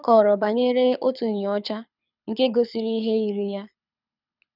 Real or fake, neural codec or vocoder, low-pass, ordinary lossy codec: fake; codec, 24 kHz, 6 kbps, HILCodec; 5.4 kHz; none